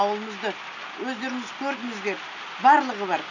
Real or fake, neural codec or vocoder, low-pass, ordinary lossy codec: real; none; 7.2 kHz; none